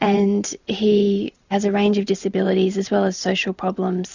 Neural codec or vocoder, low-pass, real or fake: vocoder, 44.1 kHz, 128 mel bands every 512 samples, BigVGAN v2; 7.2 kHz; fake